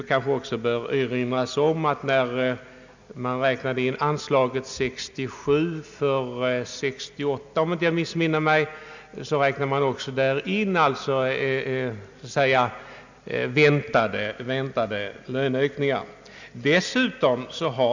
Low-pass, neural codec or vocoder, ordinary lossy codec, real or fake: 7.2 kHz; none; none; real